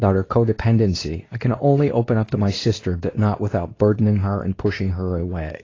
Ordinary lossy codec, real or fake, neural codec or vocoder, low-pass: AAC, 32 kbps; fake; codec, 24 kHz, 0.9 kbps, WavTokenizer, medium speech release version 2; 7.2 kHz